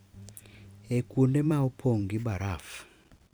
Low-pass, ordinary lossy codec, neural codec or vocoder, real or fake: none; none; vocoder, 44.1 kHz, 128 mel bands every 512 samples, BigVGAN v2; fake